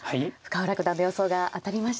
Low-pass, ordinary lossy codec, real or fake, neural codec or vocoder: none; none; real; none